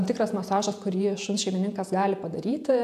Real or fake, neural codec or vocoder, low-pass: fake; vocoder, 48 kHz, 128 mel bands, Vocos; 14.4 kHz